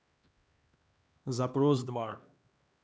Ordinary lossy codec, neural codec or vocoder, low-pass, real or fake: none; codec, 16 kHz, 2 kbps, X-Codec, HuBERT features, trained on LibriSpeech; none; fake